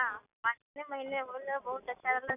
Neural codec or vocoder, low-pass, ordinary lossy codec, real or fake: none; 3.6 kHz; none; real